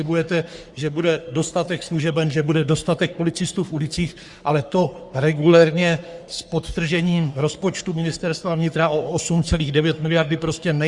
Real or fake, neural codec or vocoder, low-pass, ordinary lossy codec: fake; codec, 44.1 kHz, 3.4 kbps, Pupu-Codec; 10.8 kHz; Opus, 64 kbps